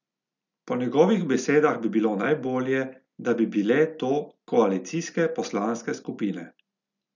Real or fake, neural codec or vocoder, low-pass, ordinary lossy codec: real; none; 7.2 kHz; none